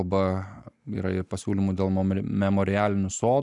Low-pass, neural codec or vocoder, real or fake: 10.8 kHz; none; real